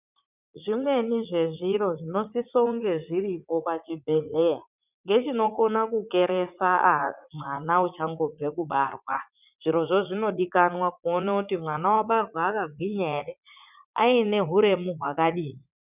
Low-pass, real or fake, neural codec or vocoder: 3.6 kHz; fake; vocoder, 44.1 kHz, 80 mel bands, Vocos